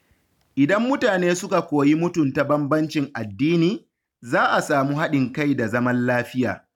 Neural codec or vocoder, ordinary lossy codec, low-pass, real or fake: none; none; 19.8 kHz; real